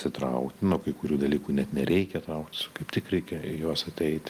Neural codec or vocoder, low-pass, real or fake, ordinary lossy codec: none; 14.4 kHz; real; Opus, 32 kbps